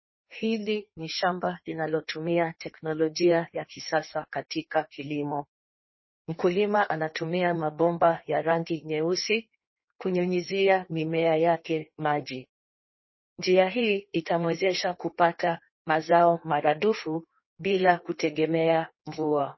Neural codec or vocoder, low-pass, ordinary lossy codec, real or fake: codec, 16 kHz in and 24 kHz out, 1.1 kbps, FireRedTTS-2 codec; 7.2 kHz; MP3, 24 kbps; fake